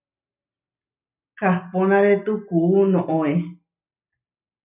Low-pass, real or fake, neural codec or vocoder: 3.6 kHz; real; none